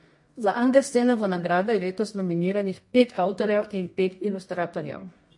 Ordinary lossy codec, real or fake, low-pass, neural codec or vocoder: MP3, 48 kbps; fake; 10.8 kHz; codec, 24 kHz, 0.9 kbps, WavTokenizer, medium music audio release